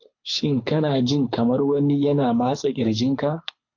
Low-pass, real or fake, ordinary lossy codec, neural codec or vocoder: 7.2 kHz; fake; AAC, 48 kbps; codec, 24 kHz, 6 kbps, HILCodec